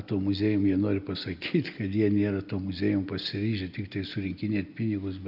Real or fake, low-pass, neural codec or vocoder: real; 5.4 kHz; none